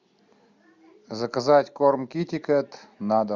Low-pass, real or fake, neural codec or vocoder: 7.2 kHz; real; none